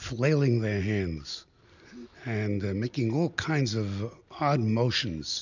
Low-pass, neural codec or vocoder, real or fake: 7.2 kHz; none; real